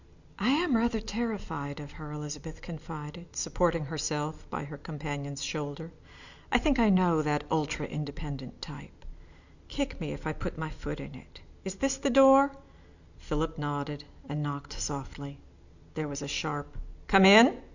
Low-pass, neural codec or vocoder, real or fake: 7.2 kHz; none; real